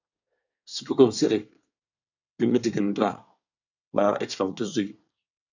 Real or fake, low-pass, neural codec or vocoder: fake; 7.2 kHz; codec, 24 kHz, 1 kbps, SNAC